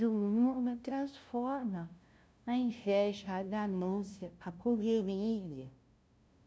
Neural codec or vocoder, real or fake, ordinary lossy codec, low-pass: codec, 16 kHz, 0.5 kbps, FunCodec, trained on LibriTTS, 25 frames a second; fake; none; none